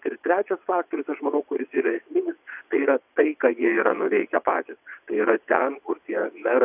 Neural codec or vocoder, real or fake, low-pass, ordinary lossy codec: vocoder, 22.05 kHz, 80 mel bands, WaveNeXt; fake; 3.6 kHz; AAC, 32 kbps